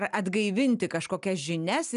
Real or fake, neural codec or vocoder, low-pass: real; none; 10.8 kHz